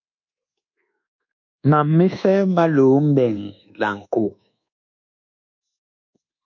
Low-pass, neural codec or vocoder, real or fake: 7.2 kHz; codec, 24 kHz, 1.2 kbps, DualCodec; fake